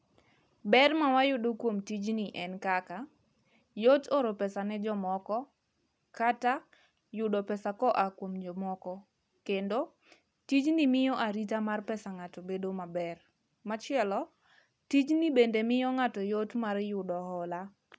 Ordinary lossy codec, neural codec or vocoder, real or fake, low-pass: none; none; real; none